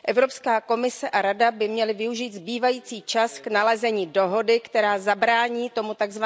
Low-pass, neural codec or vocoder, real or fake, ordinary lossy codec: none; none; real; none